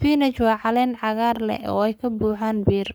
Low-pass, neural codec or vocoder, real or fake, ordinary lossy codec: none; codec, 44.1 kHz, 7.8 kbps, Pupu-Codec; fake; none